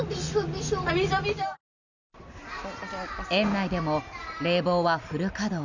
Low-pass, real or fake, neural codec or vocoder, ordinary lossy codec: 7.2 kHz; real; none; none